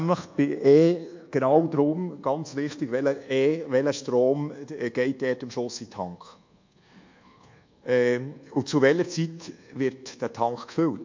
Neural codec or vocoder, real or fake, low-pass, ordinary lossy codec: codec, 24 kHz, 1.2 kbps, DualCodec; fake; 7.2 kHz; MP3, 48 kbps